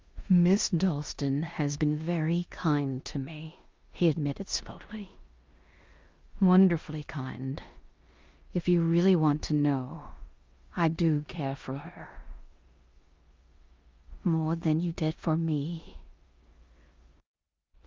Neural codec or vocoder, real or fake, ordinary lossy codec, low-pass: codec, 16 kHz in and 24 kHz out, 0.9 kbps, LongCat-Audio-Codec, four codebook decoder; fake; Opus, 32 kbps; 7.2 kHz